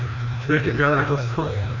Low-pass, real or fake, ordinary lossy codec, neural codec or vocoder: 7.2 kHz; fake; none; codec, 16 kHz, 1 kbps, FreqCodec, larger model